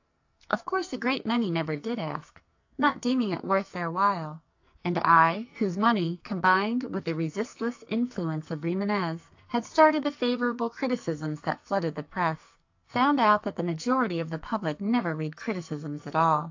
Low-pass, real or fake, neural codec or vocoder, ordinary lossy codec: 7.2 kHz; fake; codec, 44.1 kHz, 2.6 kbps, SNAC; AAC, 48 kbps